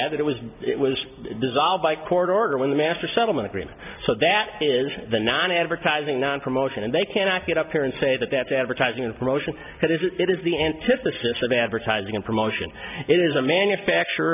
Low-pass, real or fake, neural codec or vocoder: 3.6 kHz; real; none